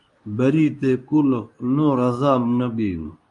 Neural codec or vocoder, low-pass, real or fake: codec, 24 kHz, 0.9 kbps, WavTokenizer, medium speech release version 2; 10.8 kHz; fake